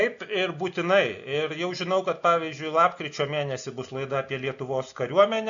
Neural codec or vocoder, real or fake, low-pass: none; real; 7.2 kHz